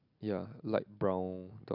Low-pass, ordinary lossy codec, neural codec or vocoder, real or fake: 5.4 kHz; none; none; real